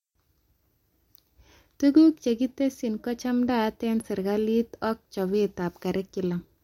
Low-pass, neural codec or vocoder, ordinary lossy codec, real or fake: 19.8 kHz; none; MP3, 64 kbps; real